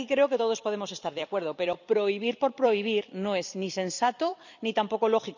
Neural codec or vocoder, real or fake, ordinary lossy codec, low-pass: vocoder, 44.1 kHz, 128 mel bands every 256 samples, BigVGAN v2; fake; none; 7.2 kHz